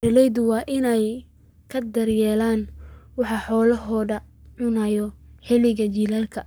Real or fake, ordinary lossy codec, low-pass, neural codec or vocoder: fake; none; none; codec, 44.1 kHz, 7.8 kbps, Pupu-Codec